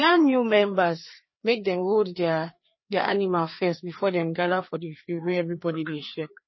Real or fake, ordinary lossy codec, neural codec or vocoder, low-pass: fake; MP3, 24 kbps; codec, 16 kHz, 2 kbps, FreqCodec, larger model; 7.2 kHz